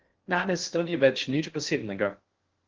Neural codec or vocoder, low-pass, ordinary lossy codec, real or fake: codec, 16 kHz in and 24 kHz out, 0.6 kbps, FocalCodec, streaming, 2048 codes; 7.2 kHz; Opus, 16 kbps; fake